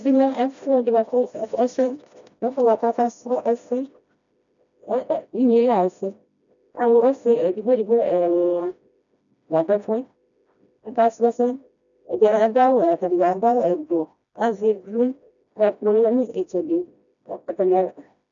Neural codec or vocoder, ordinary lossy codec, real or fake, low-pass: codec, 16 kHz, 1 kbps, FreqCodec, smaller model; MP3, 96 kbps; fake; 7.2 kHz